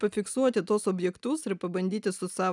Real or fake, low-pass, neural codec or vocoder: real; 10.8 kHz; none